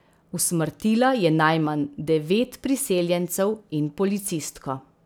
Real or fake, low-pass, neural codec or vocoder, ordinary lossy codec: real; none; none; none